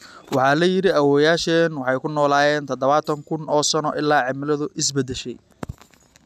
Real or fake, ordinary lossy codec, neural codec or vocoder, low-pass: real; none; none; 14.4 kHz